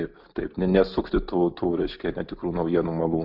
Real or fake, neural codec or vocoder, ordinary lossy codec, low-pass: real; none; Opus, 64 kbps; 5.4 kHz